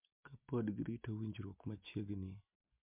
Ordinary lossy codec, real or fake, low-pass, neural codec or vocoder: MP3, 32 kbps; real; 3.6 kHz; none